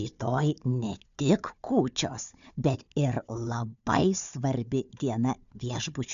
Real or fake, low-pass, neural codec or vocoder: fake; 7.2 kHz; codec, 16 kHz, 4 kbps, FunCodec, trained on Chinese and English, 50 frames a second